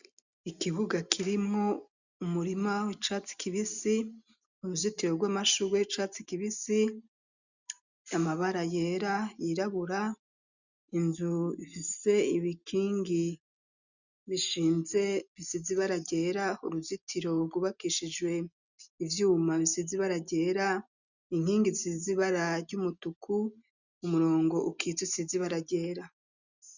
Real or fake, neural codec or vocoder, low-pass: real; none; 7.2 kHz